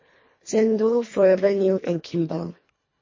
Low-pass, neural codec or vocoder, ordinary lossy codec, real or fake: 7.2 kHz; codec, 24 kHz, 1.5 kbps, HILCodec; MP3, 32 kbps; fake